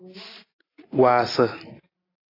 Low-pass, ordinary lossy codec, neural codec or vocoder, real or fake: 5.4 kHz; AAC, 24 kbps; none; real